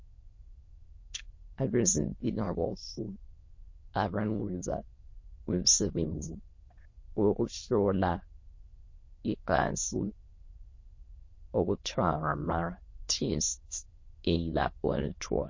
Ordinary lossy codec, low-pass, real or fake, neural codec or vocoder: MP3, 32 kbps; 7.2 kHz; fake; autoencoder, 22.05 kHz, a latent of 192 numbers a frame, VITS, trained on many speakers